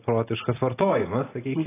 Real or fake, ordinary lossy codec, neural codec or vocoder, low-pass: real; AAC, 16 kbps; none; 3.6 kHz